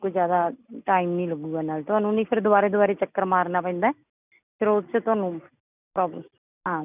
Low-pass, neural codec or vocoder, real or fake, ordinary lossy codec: 3.6 kHz; none; real; none